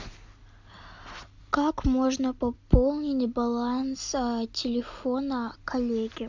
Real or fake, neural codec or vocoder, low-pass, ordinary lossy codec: real; none; 7.2 kHz; MP3, 64 kbps